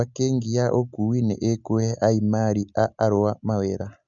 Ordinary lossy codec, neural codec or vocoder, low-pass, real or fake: MP3, 96 kbps; none; 7.2 kHz; real